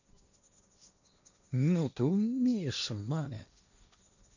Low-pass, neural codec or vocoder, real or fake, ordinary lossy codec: 7.2 kHz; codec, 16 kHz, 1.1 kbps, Voila-Tokenizer; fake; none